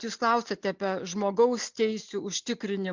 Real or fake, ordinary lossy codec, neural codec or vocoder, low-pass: real; MP3, 64 kbps; none; 7.2 kHz